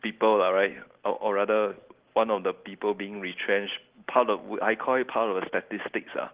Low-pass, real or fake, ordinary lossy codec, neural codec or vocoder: 3.6 kHz; real; Opus, 16 kbps; none